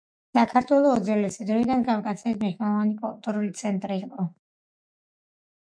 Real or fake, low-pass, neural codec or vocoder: fake; 9.9 kHz; autoencoder, 48 kHz, 128 numbers a frame, DAC-VAE, trained on Japanese speech